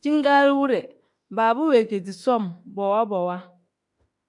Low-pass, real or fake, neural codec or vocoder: 10.8 kHz; fake; autoencoder, 48 kHz, 32 numbers a frame, DAC-VAE, trained on Japanese speech